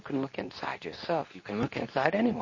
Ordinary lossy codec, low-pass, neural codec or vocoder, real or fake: MP3, 32 kbps; 7.2 kHz; codec, 24 kHz, 0.9 kbps, WavTokenizer, medium speech release version 2; fake